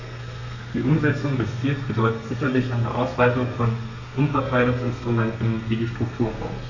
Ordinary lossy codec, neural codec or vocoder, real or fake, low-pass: AAC, 48 kbps; codec, 32 kHz, 1.9 kbps, SNAC; fake; 7.2 kHz